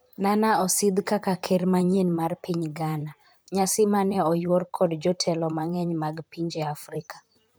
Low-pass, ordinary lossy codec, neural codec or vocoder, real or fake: none; none; vocoder, 44.1 kHz, 128 mel bands, Pupu-Vocoder; fake